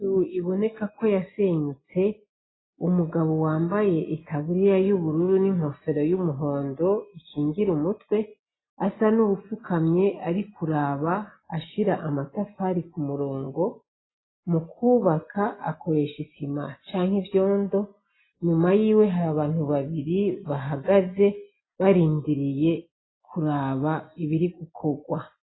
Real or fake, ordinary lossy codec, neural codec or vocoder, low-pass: real; AAC, 16 kbps; none; 7.2 kHz